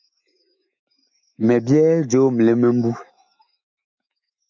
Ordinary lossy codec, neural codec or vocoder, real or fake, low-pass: MP3, 64 kbps; autoencoder, 48 kHz, 128 numbers a frame, DAC-VAE, trained on Japanese speech; fake; 7.2 kHz